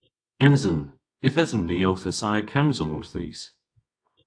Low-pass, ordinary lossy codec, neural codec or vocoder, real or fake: 9.9 kHz; AAC, 64 kbps; codec, 24 kHz, 0.9 kbps, WavTokenizer, medium music audio release; fake